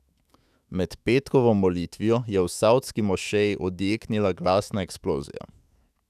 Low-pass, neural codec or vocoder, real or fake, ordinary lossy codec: 14.4 kHz; autoencoder, 48 kHz, 128 numbers a frame, DAC-VAE, trained on Japanese speech; fake; none